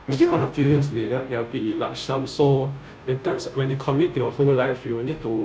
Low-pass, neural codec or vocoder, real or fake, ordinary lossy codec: none; codec, 16 kHz, 0.5 kbps, FunCodec, trained on Chinese and English, 25 frames a second; fake; none